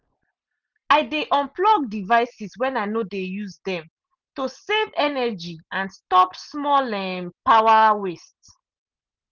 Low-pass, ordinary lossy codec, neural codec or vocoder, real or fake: 7.2 kHz; Opus, 24 kbps; none; real